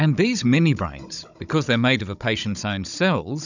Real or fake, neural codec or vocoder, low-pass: fake; codec, 16 kHz, 16 kbps, FunCodec, trained on Chinese and English, 50 frames a second; 7.2 kHz